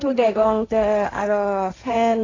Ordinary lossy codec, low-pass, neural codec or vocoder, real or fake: AAC, 32 kbps; 7.2 kHz; codec, 16 kHz, 1.1 kbps, Voila-Tokenizer; fake